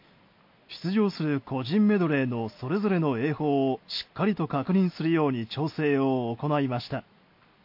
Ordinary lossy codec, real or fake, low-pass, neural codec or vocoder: MP3, 32 kbps; real; 5.4 kHz; none